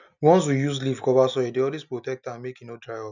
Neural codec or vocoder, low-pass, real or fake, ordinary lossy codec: none; 7.2 kHz; real; none